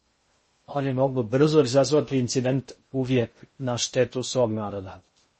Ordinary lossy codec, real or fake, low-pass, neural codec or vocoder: MP3, 32 kbps; fake; 10.8 kHz; codec, 16 kHz in and 24 kHz out, 0.6 kbps, FocalCodec, streaming, 2048 codes